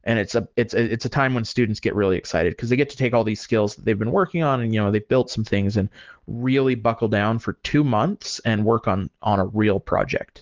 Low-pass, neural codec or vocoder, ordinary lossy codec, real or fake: 7.2 kHz; none; Opus, 16 kbps; real